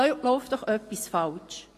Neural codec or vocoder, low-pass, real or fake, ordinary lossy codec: none; 14.4 kHz; real; AAC, 48 kbps